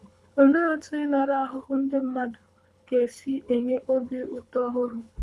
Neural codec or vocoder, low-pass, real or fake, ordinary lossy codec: codec, 24 kHz, 3 kbps, HILCodec; none; fake; none